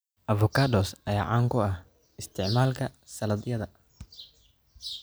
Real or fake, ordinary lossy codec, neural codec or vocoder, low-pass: real; none; none; none